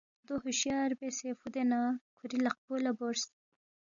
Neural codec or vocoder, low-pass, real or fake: none; 7.2 kHz; real